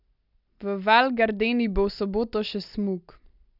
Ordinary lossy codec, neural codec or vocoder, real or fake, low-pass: none; none; real; 5.4 kHz